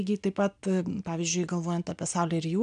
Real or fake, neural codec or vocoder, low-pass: real; none; 9.9 kHz